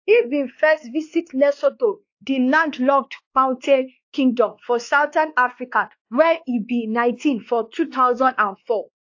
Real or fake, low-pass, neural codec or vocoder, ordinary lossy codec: fake; 7.2 kHz; codec, 16 kHz, 2 kbps, X-Codec, WavLM features, trained on Multilingual LibriSpeech; AAC, 48 kbps